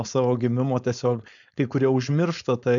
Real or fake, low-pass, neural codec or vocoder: fake; 7.2 kHz; codec, 16 kHz, 4.8 kbps, FACodec